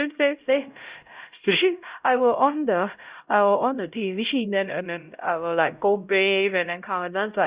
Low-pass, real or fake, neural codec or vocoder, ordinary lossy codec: 3.6 kHz; fake; codec, 16 kHz, 0.5 kbps, X-Codec, HuBERT features, trained on LibriSpeech; Opus, 64 kbps